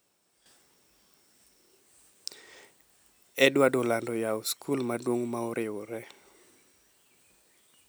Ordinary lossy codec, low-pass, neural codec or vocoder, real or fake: none; none; none; real